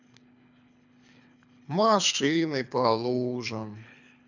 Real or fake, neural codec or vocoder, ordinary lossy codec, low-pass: fake; codec, 24 kHz, 3 kbps, HILCodec; none; 7.2 kHz